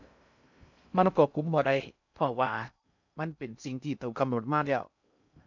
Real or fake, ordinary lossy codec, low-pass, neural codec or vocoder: fake; none; 7.2 kHz; codec, 16 kHz in and 24 kHz out, 0.6 kbps, FocalCodec, streaming, 2048 codes